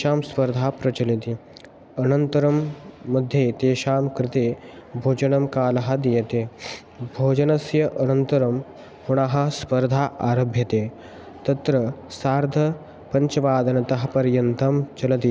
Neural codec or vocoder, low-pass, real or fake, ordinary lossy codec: none; none; real; none